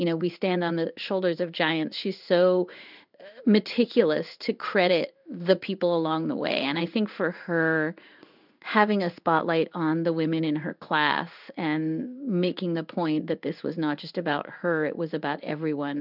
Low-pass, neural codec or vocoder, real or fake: 5.4 kHz; codec, 16 kHz in and 24 kHz out, 1 kbps, XY-Tokenizer; fake